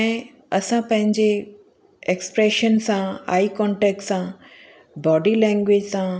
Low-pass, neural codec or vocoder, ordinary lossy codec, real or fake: none; none; none; real